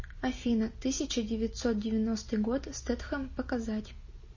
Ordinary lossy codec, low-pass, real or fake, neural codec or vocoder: MP3, 32 kbps; 7.2 kHz; real; none